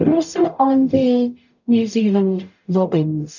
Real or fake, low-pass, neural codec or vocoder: fake; 7.2 kHz; codec, 44.1 kHz, 0.9 kbps, DAC